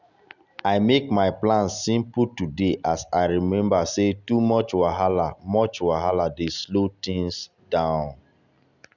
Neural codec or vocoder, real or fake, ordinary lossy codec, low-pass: none; real; none; 7.2 kHz